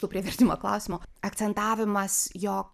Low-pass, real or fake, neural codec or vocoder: 14.4 kHz; fake; vocoder, 44.1 kHz, 128 mel bands every 256 samples, BigVGAN v2